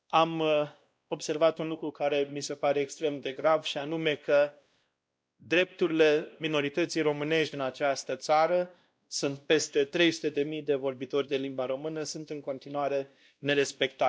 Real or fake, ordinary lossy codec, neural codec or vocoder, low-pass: fake; none; codec, 16 kHz, 1 kbps, X-Codec, WavLM features, trained on Multilingual LibriSpeech; none